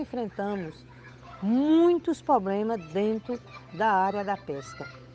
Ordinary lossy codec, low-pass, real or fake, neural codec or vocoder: none; none; fake; codec, 16 kHz, 8 kbps, FunCodec, trained on Chinese and English, 25 frames a second